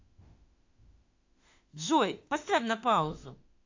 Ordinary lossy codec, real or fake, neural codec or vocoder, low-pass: AAC, 48 kbps; fake; autoencoder, 48 kHz, 32 numbers a frame, DAC-VAE, trained on Japanese speech; 7.2 kHz